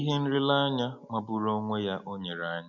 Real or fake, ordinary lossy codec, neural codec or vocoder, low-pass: real; MP3, 64 kbps; none; 7.2 kHz